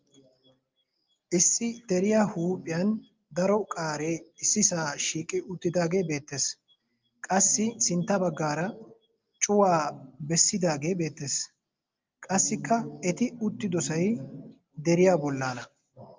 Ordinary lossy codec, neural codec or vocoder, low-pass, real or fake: Opus, 24 kbps; none; 7.2 kHz; real